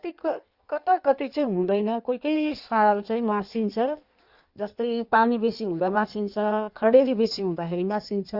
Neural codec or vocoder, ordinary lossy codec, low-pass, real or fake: codec, 16 kHz in and 24 kHz out, 1.1 kbps, FireRedTTS-2 codec; Opus, 64 kbps; 5.4 kHz; fake